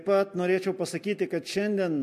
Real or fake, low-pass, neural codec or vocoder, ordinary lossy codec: real; 14.4 kHz; none; MP3, 64 kbps